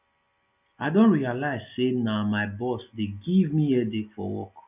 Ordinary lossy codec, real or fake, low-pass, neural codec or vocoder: none; real; 3.6 kHz; none